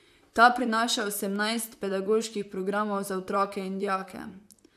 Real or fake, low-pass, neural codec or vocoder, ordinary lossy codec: fake; 14.4 kHz; vocoder, 44.1 kHz, 128 mel bands, Pupu-Vocoder; none